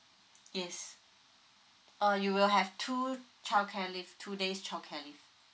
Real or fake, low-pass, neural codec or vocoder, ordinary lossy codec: real; none; none; none